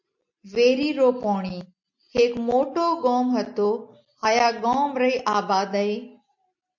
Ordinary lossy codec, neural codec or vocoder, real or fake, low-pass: MP3, 48 kbps; none; real; 7.2 kHz